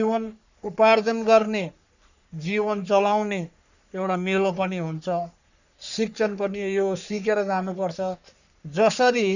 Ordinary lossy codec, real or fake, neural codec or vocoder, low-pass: none; fake; codec, 44.1 kHz, 3.4 kbps, Pupu-Codec; 7.2 kHz